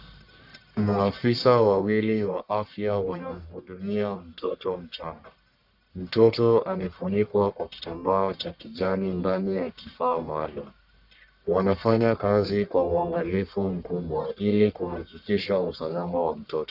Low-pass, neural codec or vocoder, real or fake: 5.4 kHz; codec, 44.1 kHz, 1.7 kbps, Pupu-Codec; fake